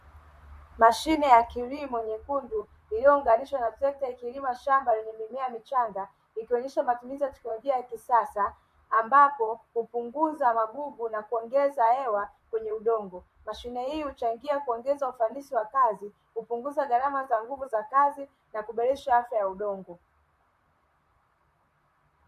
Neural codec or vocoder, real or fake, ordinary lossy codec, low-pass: vocoder, 44.1 kHz, 128 mel bands, Pupu-Vocoder; fake; MP3, 64 kbps; 14.4 kHz